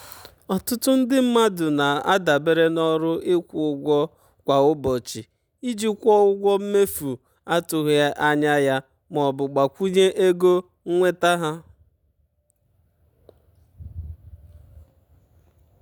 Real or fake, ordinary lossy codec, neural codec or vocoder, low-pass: real; none; none; none